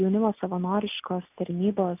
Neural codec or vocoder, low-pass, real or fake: none; 3.6 kHz; real